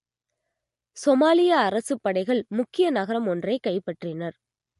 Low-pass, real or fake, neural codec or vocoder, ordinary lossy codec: 14.4 kHz; real; none; MP3, 48 kbps